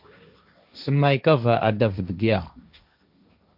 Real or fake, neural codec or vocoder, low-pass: fake; codec, 16 kHz, 1.1 kbps, Voila-Tokenizer; 5.4 kHz